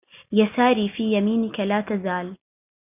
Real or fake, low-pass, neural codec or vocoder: real; 3.6 kHz; none